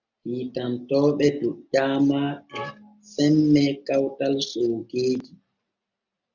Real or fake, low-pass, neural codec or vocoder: real; 7.2 kHz; none